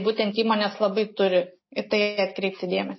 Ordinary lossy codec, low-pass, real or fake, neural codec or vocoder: MP3, 24 kbps; 7.2 kHz; real; none